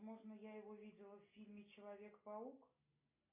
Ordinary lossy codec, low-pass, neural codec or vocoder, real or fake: MP3, 24 kbps; 3.6 kHz; none; real